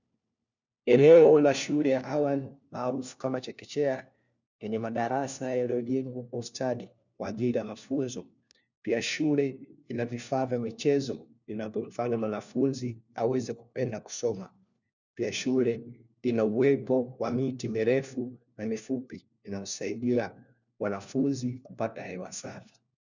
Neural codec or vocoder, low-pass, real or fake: codec, 16 kHz, 1 kbps, FunCodec, trained on LibriTTS, 50 frames a second; 7.2 kHz; fake